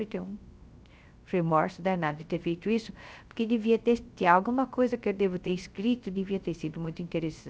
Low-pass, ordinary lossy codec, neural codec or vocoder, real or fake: none; none; codec, 16 kHz, 0.3 kbps, FocalCodec; fake